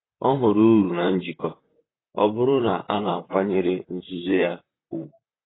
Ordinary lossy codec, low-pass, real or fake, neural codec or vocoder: AAC, 16 kbps; 7.2 kHz; fake; vocoder, 44.1 kHz, 128 mel bands, Pupu-Vocoder